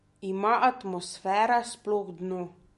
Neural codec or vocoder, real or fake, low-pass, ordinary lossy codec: none; real; 14.4 kHz; MP3, 48 kbps